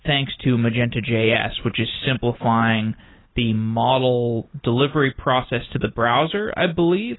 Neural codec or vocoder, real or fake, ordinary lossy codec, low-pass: none; real; AAC, 16 kbps; 7.2 kHz